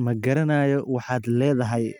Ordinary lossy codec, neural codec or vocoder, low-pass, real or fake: none; none; 19.8 kHz; real